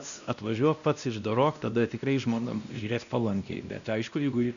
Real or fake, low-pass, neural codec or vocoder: fake; 7.2 kHz; codec, 16 kHz, 1 kbps, X-Codec, WavLM features, trained on Multilingual LibriSpeech